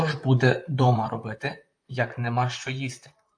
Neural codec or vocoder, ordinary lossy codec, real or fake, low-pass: vocoder, 44.1 kHz, 128 mel bands, Pupu-Vocoder; AAC, 64 kbps; fake; 9.9 kHz